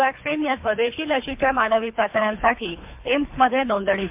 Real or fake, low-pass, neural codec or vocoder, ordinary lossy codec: fake; 3.6 kHz; codec, 24 kHz, 3 kbps, HILCodec; MP3, 32 kbps